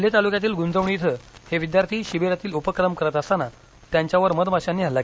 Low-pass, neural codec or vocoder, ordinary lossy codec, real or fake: none; none; none; real